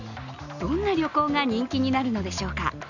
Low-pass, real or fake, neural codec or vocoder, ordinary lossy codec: 7.2 kHz; real; none; none